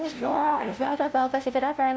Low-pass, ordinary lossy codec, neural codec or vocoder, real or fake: none; none; codec, 16 kHz, 0.5 kbps, FunCodec, trained on LibriTTS, 25 frames a second; fake